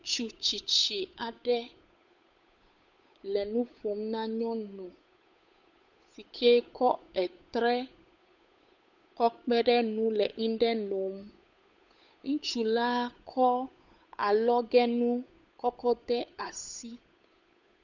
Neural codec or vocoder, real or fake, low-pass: codec, 16 kHz, 8 kbps, FunCodec, trained on Chinese and English, 25 frames a second; fake; 7.2 kHz